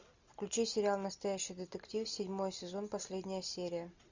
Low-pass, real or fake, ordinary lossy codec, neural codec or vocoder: 7.2 kHz; real; Opus, 64 kbps; none